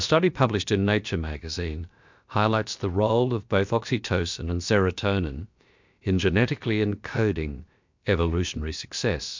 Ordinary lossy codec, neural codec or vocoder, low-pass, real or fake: MP3, 64 kbps; codec, 16 kHz, about 1 kbps, DyCAST, with the encoder's durations; 7.2 kHz; fake